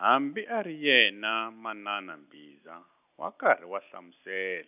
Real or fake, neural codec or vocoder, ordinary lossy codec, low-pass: real; none; none; 3.6 kHz